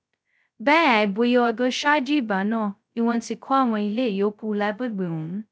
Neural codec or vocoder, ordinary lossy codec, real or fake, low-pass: codec, 16 kHz, 0.2 kbps, FocalCodec; none; fake; none